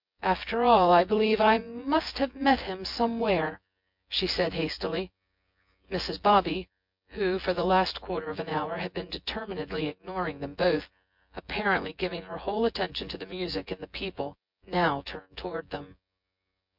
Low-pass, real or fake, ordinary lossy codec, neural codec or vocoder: 5.4 kHz; fake; MP3, 48 kbps; vocoder, 24 kHz, 100 mel bands, Vocos